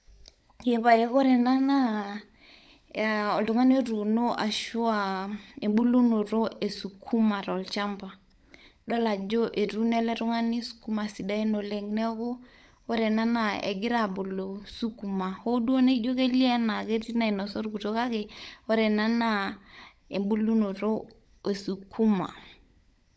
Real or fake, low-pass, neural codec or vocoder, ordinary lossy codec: fake; none; codec, 16 kHz, 16 kbps, FunCodec, trained on LibriTTS, 50 frames a second; none